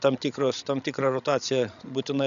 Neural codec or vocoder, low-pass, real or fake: codec, 16 kHz, 16 kbps, FreqCodec, larger model; 7.2 kHz; fake